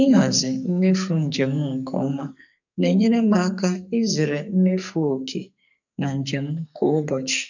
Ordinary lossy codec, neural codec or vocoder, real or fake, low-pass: none; codec, 44.1 kHz, 2.6 kbps, SNAC; fake; 7.2 kHz